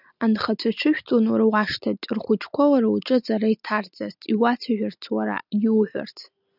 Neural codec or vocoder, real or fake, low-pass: none; real; 5.4 kHz